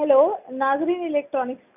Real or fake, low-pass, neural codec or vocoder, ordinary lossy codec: real; 3.6 kHz; none; none